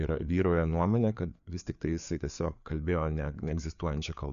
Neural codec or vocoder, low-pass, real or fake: codec, 16 kHz, 4 kbps, FreqCodec, larger model; 7.2 kHz; fake